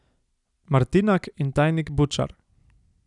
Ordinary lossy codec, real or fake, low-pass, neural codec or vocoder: none; real; 10.8 kHz; none